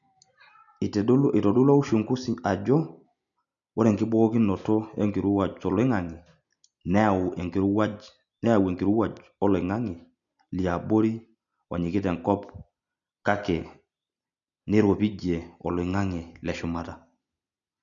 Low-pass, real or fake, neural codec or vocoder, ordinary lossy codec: 7.2 kHz; real; none; none